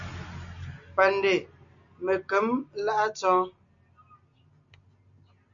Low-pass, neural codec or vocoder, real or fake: 7.2 kHz; none; real